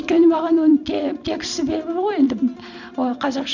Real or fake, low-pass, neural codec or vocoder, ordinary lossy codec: fake; 7.2 kHz; vocoder, 44.1 kHz, 128 mel bands every 256 samples, BigVGAN v2; none